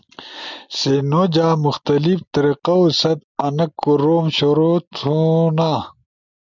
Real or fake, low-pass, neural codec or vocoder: real; 7.2 kHz; none